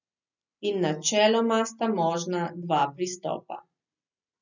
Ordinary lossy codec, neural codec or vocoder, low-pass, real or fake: none; none; 7.2 kHz; real